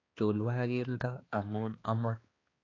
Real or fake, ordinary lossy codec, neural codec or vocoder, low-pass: fake; AAC, 32 kbps; codec, 16 kHz, 2 kbps, X-Codec, HuBERT features, trained on balanced general audio; 7.2 kHz